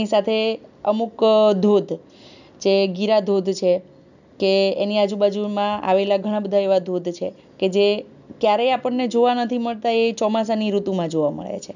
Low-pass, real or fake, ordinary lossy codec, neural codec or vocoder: 7.2 kHz; real; none; none